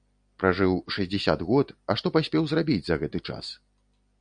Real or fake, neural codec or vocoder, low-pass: real; none; 9.9 kHz